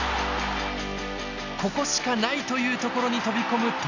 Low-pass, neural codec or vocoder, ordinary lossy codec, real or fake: 7.2 kHz; none; none; real